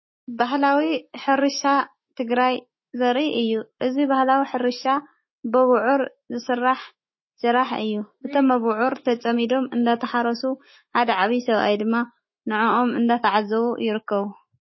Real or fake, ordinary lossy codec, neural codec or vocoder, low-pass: fake; MP3, 24 kbps; autoencoder, 48 kHz, 128 numbers a frame, DAC-VAE, trained on Japanese speech; 7.2 kHz